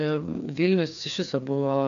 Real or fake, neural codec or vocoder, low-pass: fake; codec, 16 kHz, 2 kbps, FreqCodec, larger model; 7.2 kHz